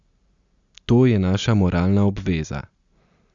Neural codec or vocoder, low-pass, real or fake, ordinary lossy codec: none; 7.2 kHz; real; Opus, 64 kbps